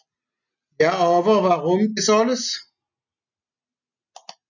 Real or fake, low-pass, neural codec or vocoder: real; 7.2 kHz; none